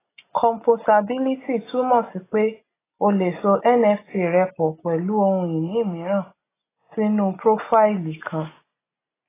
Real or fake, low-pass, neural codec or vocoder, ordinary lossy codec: real; 3.6 kHz; none; AAC, 16 kbps